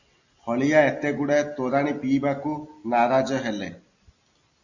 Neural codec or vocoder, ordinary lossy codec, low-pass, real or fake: none; Opus, 64 kbps; 7.2 kHz; real